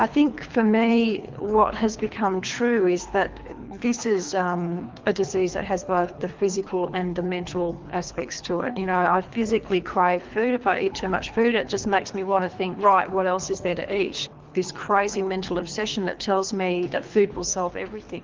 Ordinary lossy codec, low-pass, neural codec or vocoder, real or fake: Opus, 24 kbps; 7.2 kHz; codec, 24 kHz, 3 kbps, HILCodec; fake